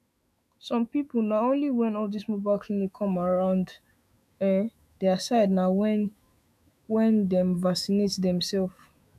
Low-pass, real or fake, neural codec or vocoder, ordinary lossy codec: 14.4 kHz; fake; autoencoder, 48 kHz, 128 numbers a frame, DAC-VAE, trained on Japanese speech; none